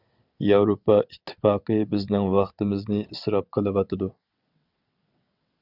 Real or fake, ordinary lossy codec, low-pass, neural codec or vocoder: fake; Opus, 64 kbps; 5.4 kHz; vocoder, 22.05 kHz, 80 mel bands, WaveNeXt